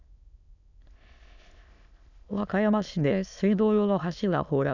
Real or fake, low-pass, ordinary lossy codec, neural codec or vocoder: fake; 7.2 kHz; none; autoencoder, 22.05 kHz, a latent of 192 numbers a frame, VITS, trained on many speakers